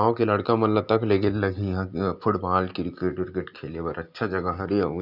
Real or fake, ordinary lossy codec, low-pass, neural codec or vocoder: real; none; 5.4 kHz; none